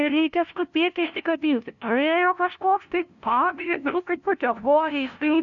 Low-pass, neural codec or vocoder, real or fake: 7.2 kHz; codec, 16 kHz, 0.5 kbps, FunCodec, trained on LibriTTS, 25 frames a second; fake